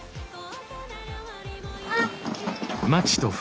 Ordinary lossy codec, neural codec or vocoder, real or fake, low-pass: none; none; real; none